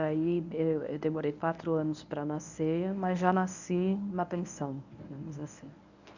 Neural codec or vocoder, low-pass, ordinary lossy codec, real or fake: codec, 24 kHz, 0.9 kbps, WavTokenizer, medium speech release version 1; 7.2 kHz; none; fake